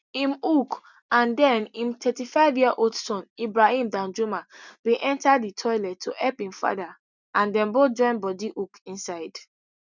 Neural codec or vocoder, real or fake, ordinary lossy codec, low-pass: none; real; none; 7.2 kHz